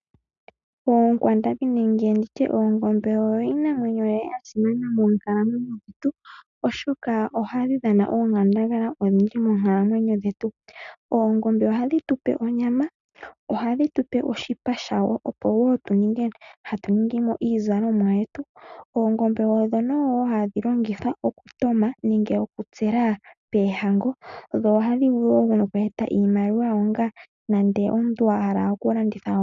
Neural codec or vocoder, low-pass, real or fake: none; 7.2 kHz; real